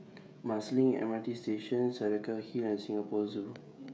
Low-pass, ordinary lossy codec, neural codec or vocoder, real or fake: none; none; codec, 16 kHz, 16 kbps, FreqCodec, smaller model; fake